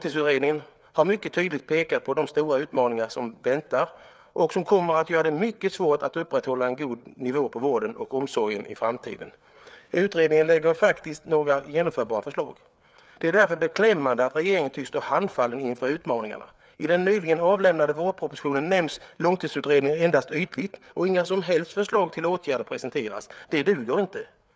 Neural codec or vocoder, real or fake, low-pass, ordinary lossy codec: codec, 16 kHz, 4 kbps, FreqCodec, larger model; fake; none; none